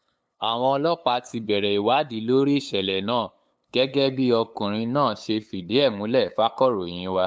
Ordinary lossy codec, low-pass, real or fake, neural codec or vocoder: none; none; fake; codec, 16 kHz, 8 kbps, FunCodec, trained on LibriTTS, 25 frames a second